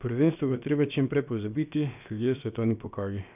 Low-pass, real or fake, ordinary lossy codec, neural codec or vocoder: 3.6 kHz; fake; none; codec, 16 kHz, about 1 kbps, DyCAST, with the encoder's durations